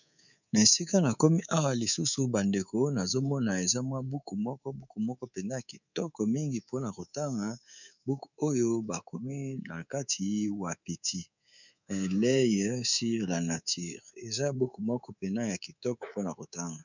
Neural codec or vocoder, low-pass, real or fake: codec, 24 kHz, 3.1 kbps, DualCodec; 7.2 kHz; fake